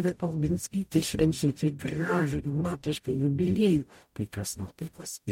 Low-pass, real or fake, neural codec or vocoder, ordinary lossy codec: 19.8 kHz; fake; codec, 44.1 kHz, 0.9 kbps, DAC; MP3, 64 kbps